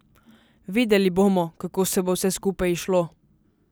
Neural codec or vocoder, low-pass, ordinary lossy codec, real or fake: none; none; none; real